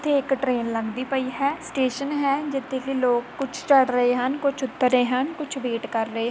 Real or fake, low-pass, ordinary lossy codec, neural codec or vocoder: real; none; none; none